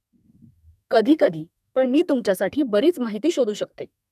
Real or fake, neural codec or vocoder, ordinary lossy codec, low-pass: fake; codec, 32 kHz, 1.9 kbps, SNAC; none; 14.4 kHz